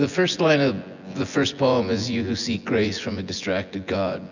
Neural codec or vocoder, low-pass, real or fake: vocoder, 24 kHz, 100 mel bands, Vocos; 7.2 kHz; fake